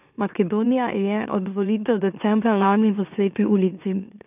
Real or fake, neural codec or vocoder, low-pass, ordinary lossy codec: fake; autoencoder, 44.1 kHz, a latent of 192 numbers a frame, MeloTTS; 3.6 kHz; none